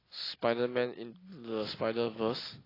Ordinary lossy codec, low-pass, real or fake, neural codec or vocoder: AAC, 24 kbps; 5.4 kHz; real; none